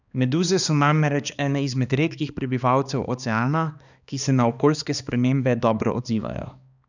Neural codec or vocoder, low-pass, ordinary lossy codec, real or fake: codec, 16 kHz, 2 kbps, X-Codec, HuBERT features, trained on balanced general audio; 7.2 kHz; none; fake